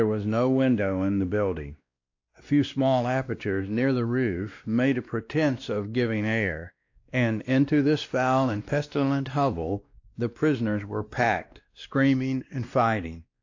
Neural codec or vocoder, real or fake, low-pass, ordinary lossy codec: codec, 16 kHz, 1 kbps, X-Codec, WavLM features, trained on Multilingual LibriSpeech; fake; 7.2 kHz; AAC, 48 kbps